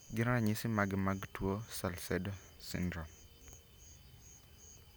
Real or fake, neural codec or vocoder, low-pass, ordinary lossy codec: real; none; none; none